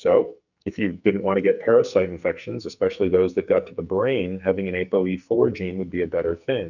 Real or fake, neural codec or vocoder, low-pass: fake; codec, 44.1 kHz, 2.6 kbps, SNAC; 7.2 kHz